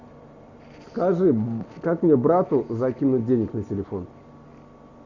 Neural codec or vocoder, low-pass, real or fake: none; 7.2 kHz; real